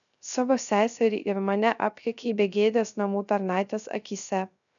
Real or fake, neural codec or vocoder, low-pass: fake; codec, 16 kHz, 0.3 kbps, FocalCodec; 7.2 kHz